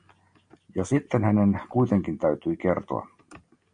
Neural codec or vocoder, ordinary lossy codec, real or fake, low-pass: none; AAC, 64 kbps; real; 9.9 kHz